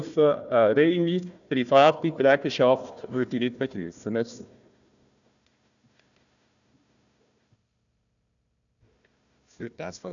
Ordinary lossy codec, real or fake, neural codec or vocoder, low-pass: none; fake; codec, 16 kHz, 1 kbps, FunCodec, trained on Chinese and English, 50 frames a second; 7.2 kHz